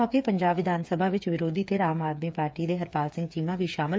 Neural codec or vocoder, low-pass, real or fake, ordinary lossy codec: codec, 16 kHz, 8 kbps, FreqCodec, smaller model; none; fake; none